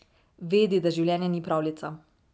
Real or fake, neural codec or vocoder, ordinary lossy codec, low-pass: real; none; none; none